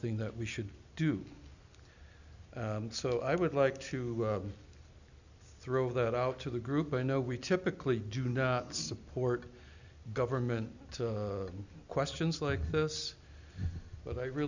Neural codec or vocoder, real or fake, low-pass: none; real; 7.2 kHz